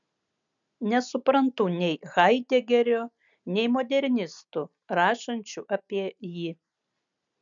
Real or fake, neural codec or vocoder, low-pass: real; none; 7.2 kHz